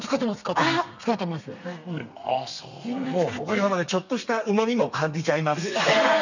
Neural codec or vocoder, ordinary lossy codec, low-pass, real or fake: codec, 32 kHz, 1.9 kbps, SNAC; none; 7.2 kHz; fake